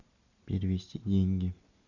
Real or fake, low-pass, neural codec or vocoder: real; 7.2 kHz; none